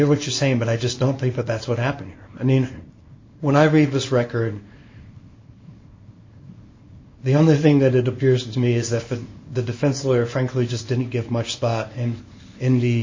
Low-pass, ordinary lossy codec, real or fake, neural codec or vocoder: 7.2 kHz; MP3, 32 kbps; fake; codec, 24 kHz, 0.9 kbps, WavTokenizer, small release